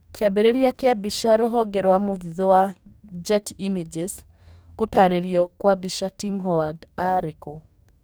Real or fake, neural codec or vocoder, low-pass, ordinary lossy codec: fake; codec, 44.1 kHz, 2.6 kbps, DAC; none; none